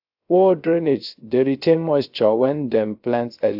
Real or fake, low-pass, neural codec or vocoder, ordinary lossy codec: fake; 5.4 kHz; codec, 16 kHz, 0.3 kbps, FocalCodec; AAC, 48 kbps